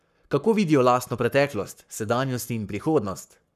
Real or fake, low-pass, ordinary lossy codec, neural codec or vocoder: fake; 14.4 kHz; none; codec, 44.1 kHz, 7.8 kbps, Pupu-Codec